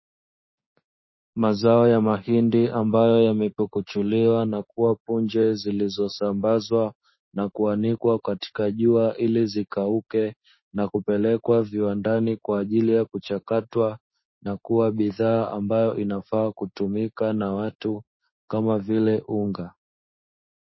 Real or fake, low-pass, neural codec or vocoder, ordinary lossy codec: fake; 7.2 kHz; codec, 16 kHz, 6 kbps, DAC; MP3, 24 kbps